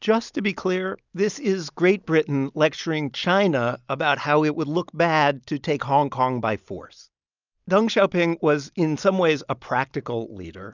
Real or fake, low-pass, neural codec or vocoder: real; 7.2 kHz; none